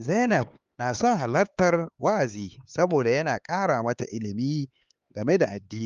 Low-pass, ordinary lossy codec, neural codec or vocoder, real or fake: 7.2 kHz; Opus, 32 kbps; codec, 16 kHz, 4 kbps, X-Codec, HuBERT features, trained on LibriSpeech; fake